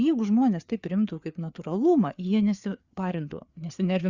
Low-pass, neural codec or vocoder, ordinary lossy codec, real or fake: 7.2 kHz; codec, 16 kHz, 4 kbps, FreqCodec, larger model; Opus, 64 kbps; fake